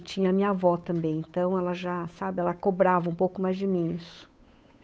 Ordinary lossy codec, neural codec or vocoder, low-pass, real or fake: none; codec, 16 kHz, 8 kbps, FunCodec, trained on Chinese and English, 25 frames a second; none; fake